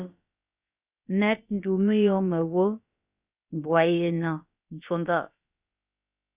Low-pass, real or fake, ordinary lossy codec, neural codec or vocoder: 3.6 kHz; fake; Opus, 64 kbps; codec, 16 kHz, about 1 kbps, DyCAST, with the encoder's durations